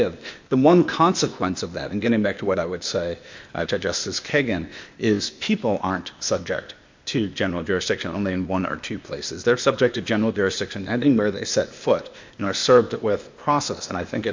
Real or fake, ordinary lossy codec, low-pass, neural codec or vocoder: fake; MP3, 64 kbps; 7.2 kHz; codec, 16 kHz, 0.8 kbps, ZipCodec